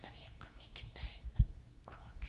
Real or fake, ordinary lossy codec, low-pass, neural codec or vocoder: fake; none; 10.8 kHz; codec, 24 kHz, 0.9 kbps, WavTokenizer, medium speech release version 2